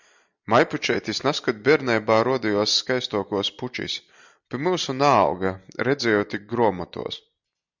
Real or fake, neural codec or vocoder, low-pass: real; none; 7.2 kHz